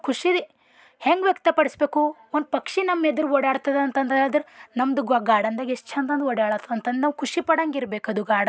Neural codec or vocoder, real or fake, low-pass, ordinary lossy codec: none; real; none; none